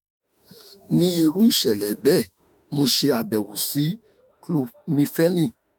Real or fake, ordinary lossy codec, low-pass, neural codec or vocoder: fake; none; none; autoencoder, 48 kHz, 32 numbers a frame, DAC-VAE, trained on Japanese speech